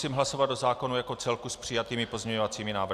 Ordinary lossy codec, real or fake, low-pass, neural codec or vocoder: Opus, 64 kbps; real; 14.4 kHz; none